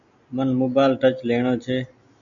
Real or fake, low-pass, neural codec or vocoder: real; 7.2 kHz; none